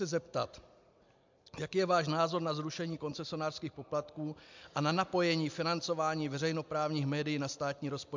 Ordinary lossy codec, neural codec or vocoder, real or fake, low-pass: MP3, 64 kbps; none; real; 7.2 kHz